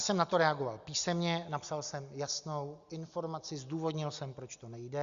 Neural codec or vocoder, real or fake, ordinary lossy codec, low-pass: none; real; Opus, 64 kbps; 7.2 kHz